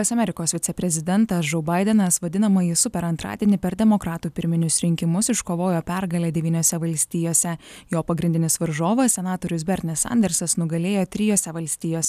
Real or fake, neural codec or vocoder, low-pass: real; none; 14.4 kHz